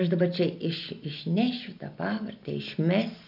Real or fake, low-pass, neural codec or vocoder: real; 5.4 kHz; none